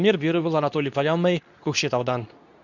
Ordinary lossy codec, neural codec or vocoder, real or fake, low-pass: none; codec, 24 kHz, 0.9 kbps, WavTokenizer, medium speech release version 2; fake; 7.2 kHz